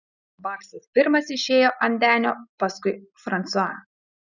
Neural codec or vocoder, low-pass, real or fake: none; 7.2 kHz; real